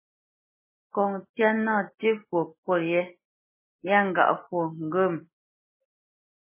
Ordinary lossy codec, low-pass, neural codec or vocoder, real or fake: MP3, 16 kbps; 3.6 kHz; none; real